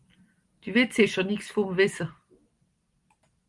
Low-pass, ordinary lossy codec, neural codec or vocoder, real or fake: 10.8 kHz; Opus, 24 kbps; none; real